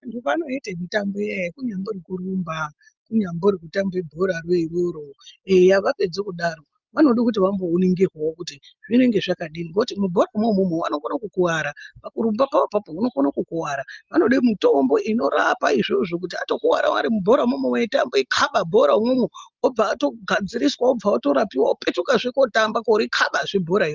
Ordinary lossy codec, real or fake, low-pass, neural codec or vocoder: Opus, 24 kbps; real; 7.2 kHz; none